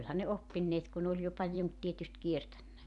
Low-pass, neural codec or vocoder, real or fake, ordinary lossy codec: 10.8 kHz; vocoder, 44.1 kHz, 128 mel bands every 512 samples, BigVGAN v2; fake; none